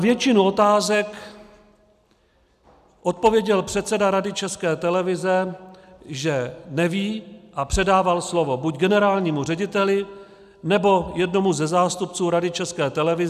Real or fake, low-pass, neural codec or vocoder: real; 14.4 kHz; none